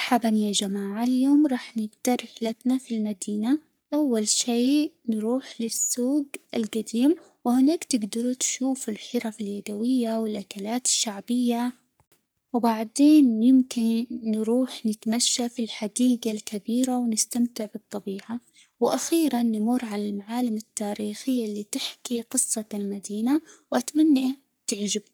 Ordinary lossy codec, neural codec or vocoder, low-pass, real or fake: none; codec, 44.1 kHz, 3.4 kbps, Pupu-Codec; none; fake